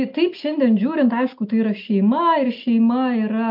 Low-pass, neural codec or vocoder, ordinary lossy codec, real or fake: 5.4 kHz; none; MP3, 48 kbps; real